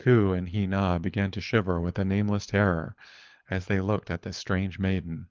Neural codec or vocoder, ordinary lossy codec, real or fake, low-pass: codec, 24 kHz, 6 kbps, HILCodec; Opus, 32 kbps; fake; 7.2 kHz